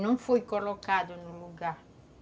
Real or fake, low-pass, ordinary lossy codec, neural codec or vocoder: real; none; none; none